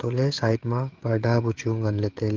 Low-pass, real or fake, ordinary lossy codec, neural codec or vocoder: 7.2 kHz; fake; Opus, 16 kbps; codec, 16 kHz, 16 kbps, FreqCodec, smaller model